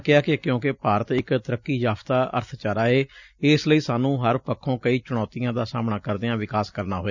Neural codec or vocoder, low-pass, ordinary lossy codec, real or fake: none; 7.2 kHz; none; real